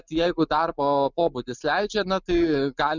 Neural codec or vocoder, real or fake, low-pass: none; real; 7.2 kHz